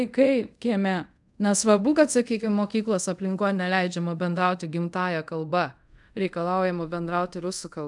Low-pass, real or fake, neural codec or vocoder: 10.8 kHz; fake; codec, 24 kHz, 0.5 kbps, DualCodec